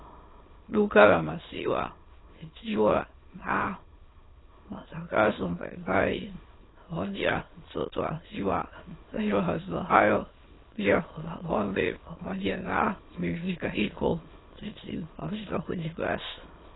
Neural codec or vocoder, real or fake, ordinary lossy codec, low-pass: autoencoder, 22.05 kHz, a latent of 192 numbers a frame, VITS, trained on many speakers; fake; AAC, 16 kbps; 7.2 kHz